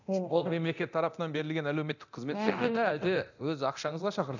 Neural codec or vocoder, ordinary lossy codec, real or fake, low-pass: codec, 24 kHz, 0.9 kbps, DualCodec; none; fake; 7.2 kHz